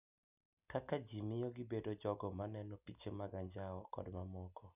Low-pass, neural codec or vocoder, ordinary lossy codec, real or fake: 3.6 kHz; none; none; real